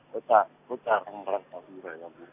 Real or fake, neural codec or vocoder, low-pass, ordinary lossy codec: real; none; 3.6 kHz; none